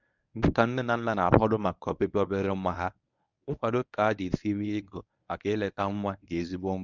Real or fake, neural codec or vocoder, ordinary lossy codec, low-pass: fake; codec, 24 kHz, 0.9 kbps, WavTokenizer, medium speech release version 1; none; 7.2 kHz